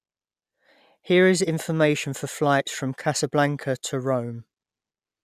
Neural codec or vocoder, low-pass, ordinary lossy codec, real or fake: none; 14.4 kHz; none; real